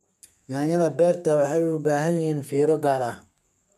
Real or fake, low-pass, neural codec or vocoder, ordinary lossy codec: fake; 14.4 kHz; codec, 32 kHz, 1.9 kbps, SNAC; none